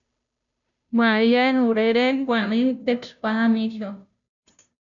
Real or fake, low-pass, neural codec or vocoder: fake; 7.2 kHz; codec, 16 kHz, 0.5 kbps, FunCodec, trained on Chinese and English, 25 frames a second